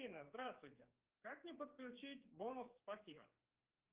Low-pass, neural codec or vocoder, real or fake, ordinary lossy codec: 3.6 kHz; codec, 16 kHz, 4 kbps, X-Codec, HuBERT features, trained on general audio; fake; Opus, 32 kbps